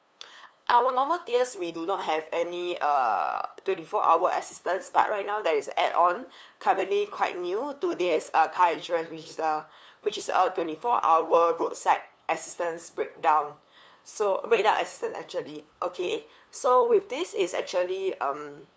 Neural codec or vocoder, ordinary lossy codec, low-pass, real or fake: codec, 16 kHz, 2 kbps, FunCodec, trained on LibriTTS, 25 frames a second; none; none; fake